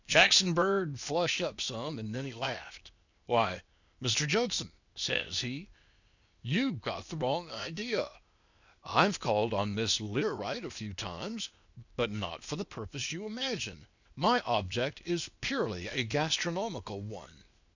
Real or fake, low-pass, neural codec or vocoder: fake; 7.2 kHz; codec, 16 kHz, 0.8 kbps, ZipCodec